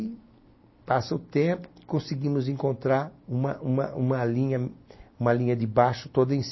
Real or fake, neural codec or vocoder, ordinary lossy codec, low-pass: real; none; MP3, 24 kbps; 7.2 kHz